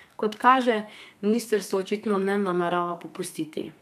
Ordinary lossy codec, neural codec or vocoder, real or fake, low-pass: none; codec, 32 kHz, 1.9 kbps, SNAC; fake; 14.4 kHz